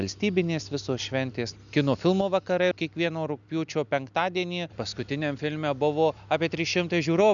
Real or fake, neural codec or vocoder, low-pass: real; none; 7.2 kHz